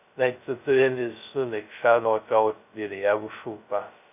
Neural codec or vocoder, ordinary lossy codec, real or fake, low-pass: codec, 16 kHz, 0.2 kbps, FocalCodec; none; fake; 3.6 kHz